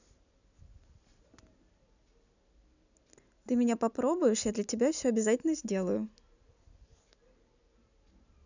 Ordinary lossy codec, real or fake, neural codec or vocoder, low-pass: none; real; none; 7.2 kHz